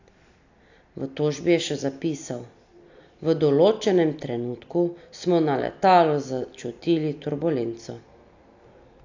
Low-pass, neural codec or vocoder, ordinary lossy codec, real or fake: 7.2 kHz; none; AAC, 48 kbps; real